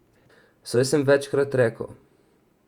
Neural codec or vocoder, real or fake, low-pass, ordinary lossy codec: vocoder, 48 kHz, 128 mel bands, Vocos; fake; 19.8 kHz; Opus, 64 kbps